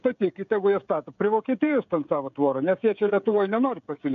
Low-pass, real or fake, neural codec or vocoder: 7.2 kHz; fake; codec, 16 kHz, 16 kbps, FreqCodec, smaller model